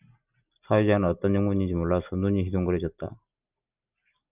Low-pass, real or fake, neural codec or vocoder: 3.6 kHz; real; none